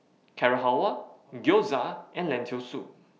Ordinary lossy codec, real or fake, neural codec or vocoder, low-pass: none; real; none; none